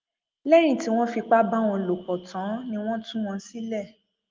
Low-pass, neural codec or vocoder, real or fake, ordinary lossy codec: 7.2 kHz; none; real; Opus, 32 kbps